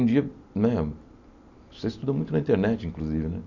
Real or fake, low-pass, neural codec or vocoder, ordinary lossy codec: real; 7.2 kHz; none; none